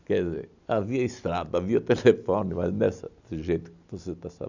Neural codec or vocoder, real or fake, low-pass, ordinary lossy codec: none; real; 7.2 kHz; none